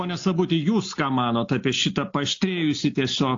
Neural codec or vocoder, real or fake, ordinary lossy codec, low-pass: none; real; AAC, 48 kbps; 7.2 kHz